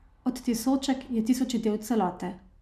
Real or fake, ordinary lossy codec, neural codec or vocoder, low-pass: real; none; none; 14.4 kHz